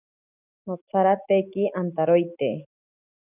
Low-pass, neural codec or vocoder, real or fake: 3.6 kHz; none; real